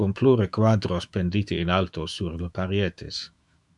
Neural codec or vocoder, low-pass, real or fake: autoencoder, 48 kHz, 128 numbers a frame, DAC-VAE, trained on Japanese speech; 10.8 kHz; fake